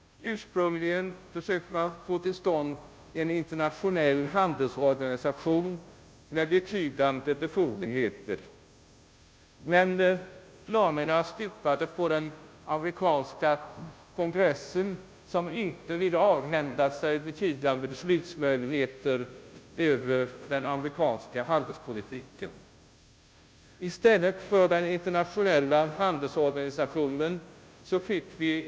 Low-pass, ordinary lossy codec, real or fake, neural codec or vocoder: none; none; fake; codec, 16 kHz, 0.5 kbps, FunCodec, trained on Chinese and English, 25 frames a second